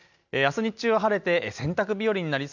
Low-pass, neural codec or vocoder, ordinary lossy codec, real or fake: 7.2 kHz; none; none; real